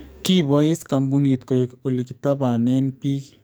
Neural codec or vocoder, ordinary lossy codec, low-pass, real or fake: codec, 44.1 kHz, 2.6 kbps, SNAC; none; none; fake